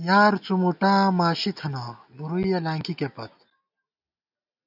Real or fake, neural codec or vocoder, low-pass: real; none; 5.4 kHz